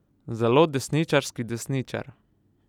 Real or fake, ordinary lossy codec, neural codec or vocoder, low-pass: real; none; none; 19.8 kHz